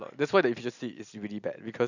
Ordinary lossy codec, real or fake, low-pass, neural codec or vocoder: none; real; 7.2 kHz; none